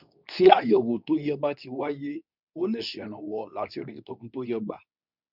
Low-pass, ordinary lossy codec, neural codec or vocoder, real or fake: 5.4 kHz; none; codec, 24 kHz, 0.9 kbps, WavTokenizer, medium speech release version 2; fake